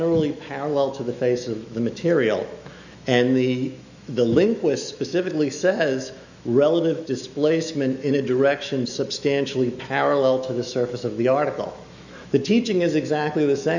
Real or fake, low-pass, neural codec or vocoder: fake; 7.2 kHz; autoencoder, 48 kHz, 128 numbers a frame, DAC-VAE, trained on Japanese speech